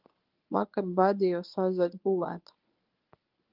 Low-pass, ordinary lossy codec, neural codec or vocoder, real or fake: 5.4 kHz; Opus, 32 kbps; codec, 24 kHz, 0.9 kbps, WavTokenizer, medium speech release version 1; fake